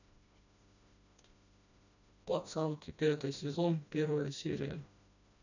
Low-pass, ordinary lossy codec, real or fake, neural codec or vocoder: 7.2 kHz; none; fake; codec, 16 kHz, 1 kbps, FreqCodec, smaller model